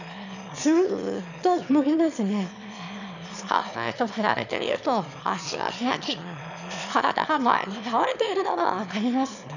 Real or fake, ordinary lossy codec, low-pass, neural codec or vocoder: fake; none; 7.2 kHz; autoencoder, 22.05 kHz, a latent of 192 numbers a frame, VITS, trained on one speaker